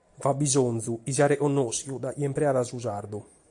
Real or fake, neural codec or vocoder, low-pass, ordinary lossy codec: real; none; 10.8 kHz; AAC, 64 kbps